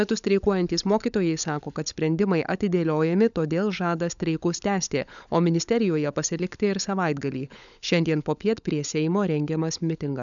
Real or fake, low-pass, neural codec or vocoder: fake; 7.2 kHz; codec, 16 kHz, 16 kbps, FunCodec, trained on LibriTTS, 50 frames a second